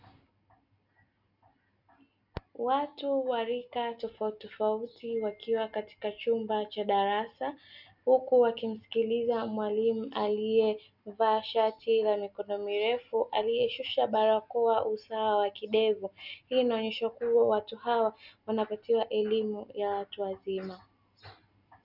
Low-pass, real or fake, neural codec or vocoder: 5.4 kHz; real; none